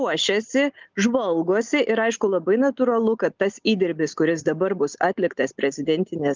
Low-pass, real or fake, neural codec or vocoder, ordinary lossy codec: 7.2 kHz; real; none; Opus, 24 kbps